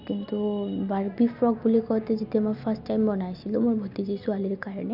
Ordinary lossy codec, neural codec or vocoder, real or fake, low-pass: none; none; real; 5.4 kHz